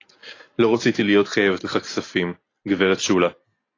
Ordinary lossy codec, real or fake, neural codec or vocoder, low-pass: AAC, 32 kbps; real; none; 7.2 kHz